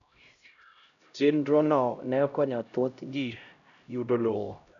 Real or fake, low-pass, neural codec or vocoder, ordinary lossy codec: fake; 7.2 kHz; codec, 16 kHz, 0.5 kbps, X-Codec, HuBERT features, trained on LibriSpeech; none